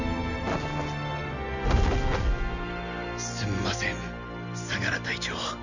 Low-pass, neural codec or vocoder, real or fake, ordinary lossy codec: 7.2 kHz; none; real; none